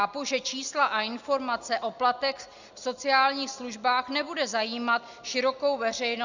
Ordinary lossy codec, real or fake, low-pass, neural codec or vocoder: Opus, 64 kbps; real; 7.2 kHz; none